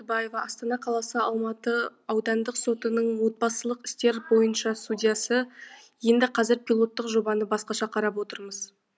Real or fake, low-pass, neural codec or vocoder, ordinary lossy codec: real; none; none; none